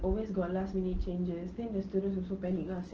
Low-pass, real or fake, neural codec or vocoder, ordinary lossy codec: 7.2 kHz; real; none; Opus, 16 kbps